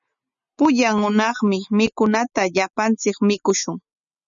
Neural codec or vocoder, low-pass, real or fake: none; 7.2 kHz; real